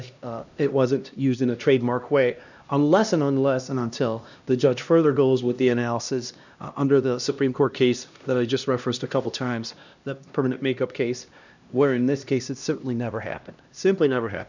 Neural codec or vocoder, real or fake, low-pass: codec, 16 kHz, 1 kbps, X-Codec, HuBERT features, trained on LibriSpeech; fake; 7.2 kHz